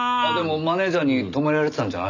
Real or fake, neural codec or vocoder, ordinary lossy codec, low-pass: real; none; none; 7.2 kHz